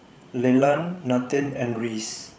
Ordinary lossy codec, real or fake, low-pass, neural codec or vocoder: none; fake; none; codec, 16 kHz, 8 kbps, FreqCodec, larger model